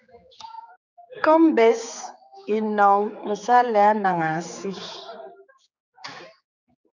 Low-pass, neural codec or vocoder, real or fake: 7.2 kHz; codec, 16 kHz, 4 kbps, X-Codec, HuBERT features, trained on general audio; fake